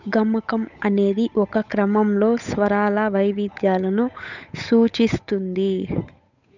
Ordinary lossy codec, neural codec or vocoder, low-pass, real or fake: none; codec, 16 kHz, 8 kbps, FunCodec, trained on Chinese and English, 25 frames a second; 7.2 kHz; fake